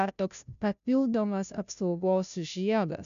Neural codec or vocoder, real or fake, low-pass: codec, 16 kHz, 0.5 kbps, FunCodec, trained on Chinese and English, 25 frames a second; fake; 7.2 kHz